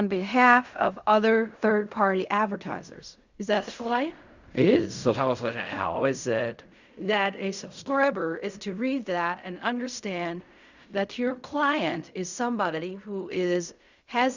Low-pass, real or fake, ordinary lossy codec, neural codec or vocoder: 7.2 kHz; fake; Opus, 64 kbps; codec, 16 kHz in and 24 kHz out, 0.4 kbps, LongCat-Audio-Codec, fine tuned four codebook decoder